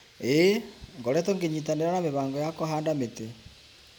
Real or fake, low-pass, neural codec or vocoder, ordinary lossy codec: real; none; none; none